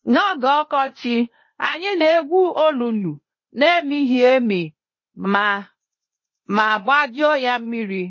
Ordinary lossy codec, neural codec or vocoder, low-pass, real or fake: MP3, 32 kbps; codec, 16 kHz, 0.8 kbps, ZipCodec; 7.2 kHz; fake